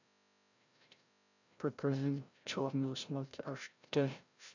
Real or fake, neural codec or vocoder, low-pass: fake; codec, 16 kHz, 0.5 kbps, FreqCodec, larger model; 7.2 kHz